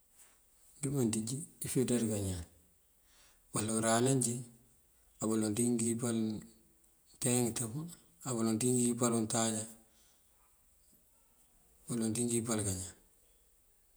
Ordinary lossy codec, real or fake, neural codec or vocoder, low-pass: none; fake; vocoder, 48 kHz, 128 mel bands, Vocos; none